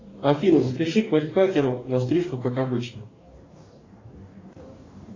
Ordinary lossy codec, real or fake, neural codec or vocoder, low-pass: AAC, 32 kbps; fake; codec, 44.1 kHz, 2.6 kbps, DAC; 7.2 kHz